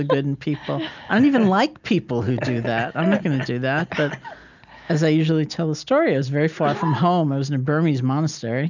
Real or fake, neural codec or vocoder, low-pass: real; none; 7.2 kHz